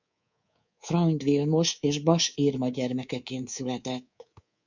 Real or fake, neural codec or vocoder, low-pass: fake; codec, 24 kHz, 3.1 kbps, DualCodec; 7.2 kHz